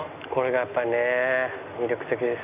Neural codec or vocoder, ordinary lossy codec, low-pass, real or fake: none; none; 3.6 kHz; real